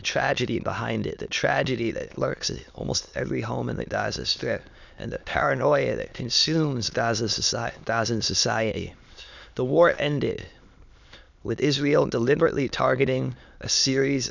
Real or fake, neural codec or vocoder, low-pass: fake; autoencoder, 22.05 kHz, a latent of 192 numbers a frame, VITS, trained on many speakers; 7.2 kHz